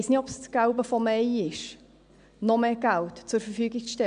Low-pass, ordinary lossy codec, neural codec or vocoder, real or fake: 9.9 kHz; none; none; real